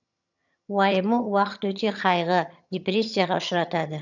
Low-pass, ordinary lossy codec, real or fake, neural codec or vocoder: 7.2 kHz; none; fake; vocoder, 22.05 kHz, 80 mel bands, HiFi-GAN